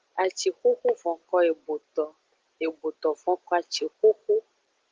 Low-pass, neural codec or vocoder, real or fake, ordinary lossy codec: 7.2 kHz; none; real; Opus, 16 kbps